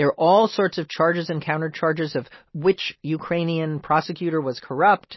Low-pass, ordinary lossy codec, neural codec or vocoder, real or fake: 7.2 kHz; MP3, 24 kbps; none; real